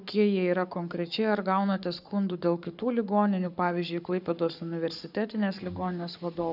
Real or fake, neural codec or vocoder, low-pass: fake; codec, 44.1 kHz, 7.8 kbps, Pupu-Codec; 5.4 kHz